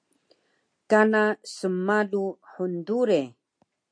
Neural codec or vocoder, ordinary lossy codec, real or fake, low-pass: none; MP3, 64 kbps; real; 9.9 kHz